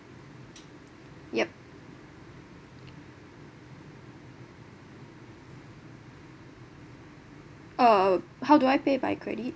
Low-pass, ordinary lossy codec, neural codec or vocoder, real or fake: none; none; none; real